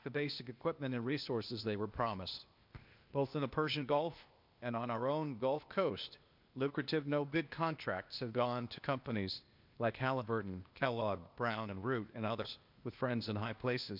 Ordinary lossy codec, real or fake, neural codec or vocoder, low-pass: MP3, 48 kbps; fake; codec, 16 kHz, 0.8 kbps, ZipCodec; 5.4 kHz